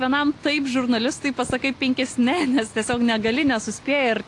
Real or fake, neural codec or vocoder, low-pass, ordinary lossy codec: real; none; 10.8 kHz; AAC, 48 kbps